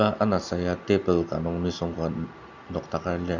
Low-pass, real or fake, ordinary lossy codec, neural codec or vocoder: 7.2 kHz; real; none; none